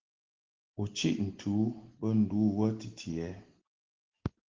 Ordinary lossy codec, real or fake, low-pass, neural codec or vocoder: Opus, 16 kbps; real; 7.2 kHz; none